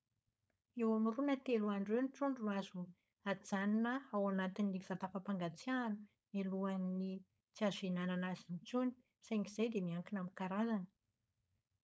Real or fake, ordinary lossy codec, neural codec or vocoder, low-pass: fake; none; codec, 16 kHz, 4.8 kbps, FACodec; none